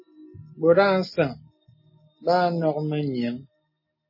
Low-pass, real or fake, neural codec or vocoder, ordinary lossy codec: 5.4 kHz; real; none; MP3, 24 kbps